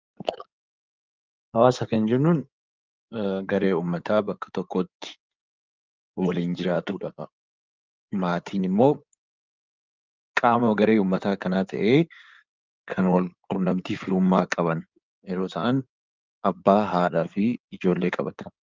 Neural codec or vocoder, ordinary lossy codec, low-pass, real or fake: codec, 16 kHz in and 24 kHz out, 2.2 kbps, FireRedTTS-2 codec; Opus, 24 kbps; 7.2 kHz; fake